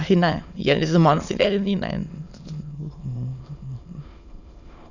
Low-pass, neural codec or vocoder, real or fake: 7.2 kHz; autoencoder, 22.05 kHz, a latent of 192 numbers a frame, VITS, trained on many speakers; fake